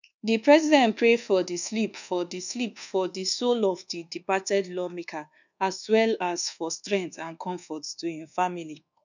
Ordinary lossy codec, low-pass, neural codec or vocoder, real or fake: none; 7.2 kHz; codec, 24 kHz, 1.2 kbps, DualCodec; fake